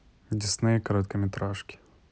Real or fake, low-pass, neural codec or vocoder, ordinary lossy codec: real; none; none; none